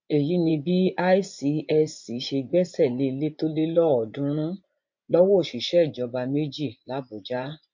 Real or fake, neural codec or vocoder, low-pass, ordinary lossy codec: fake; vocoder, 24 kHz, 100 mel bands, Vocos; 7.2 kHz; MP3, 48 kbps